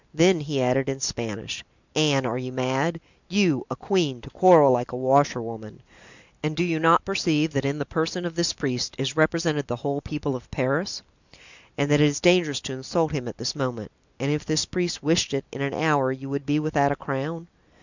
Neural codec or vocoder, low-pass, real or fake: none; 7.2 kHz; real